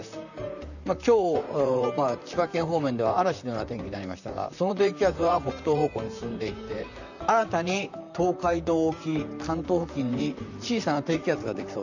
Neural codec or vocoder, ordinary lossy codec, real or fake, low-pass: vocoder, 44.1 kHz, 128 mel bands, Pupu-Vocoder; none; fake; 7.2 kHz